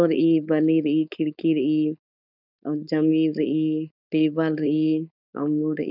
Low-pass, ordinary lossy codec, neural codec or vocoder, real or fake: 5.4 kHz; none; codec, 16 kHz, 4.8 kbps, FACodec; fake